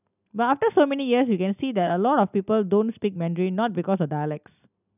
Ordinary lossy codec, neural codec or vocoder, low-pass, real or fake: none; none; 3.6 kHz; real